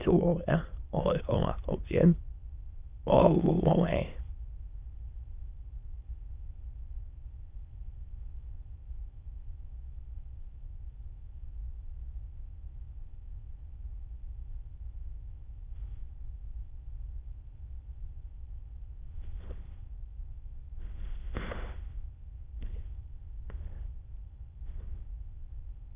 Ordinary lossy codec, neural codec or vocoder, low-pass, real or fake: Opus, 24 kbps; autoencoder, 22.05 kHz, a latent of 192 numbers a frame, VITS, trained on many speakers; 3.6 kHz; fake